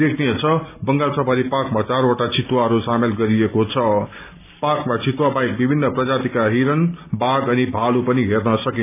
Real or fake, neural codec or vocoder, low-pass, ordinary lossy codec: real; none; 3.6 kHz; none